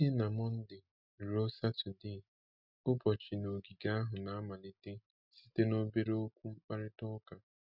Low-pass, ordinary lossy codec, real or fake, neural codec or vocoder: 5.4 kHz; none; real; none